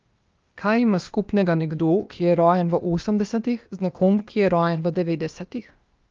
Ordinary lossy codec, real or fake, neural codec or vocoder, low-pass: Opus, 24 kbps; fake; codec, 16 kHz, 0.8 kbps, ZipCodec; 7.2 kHz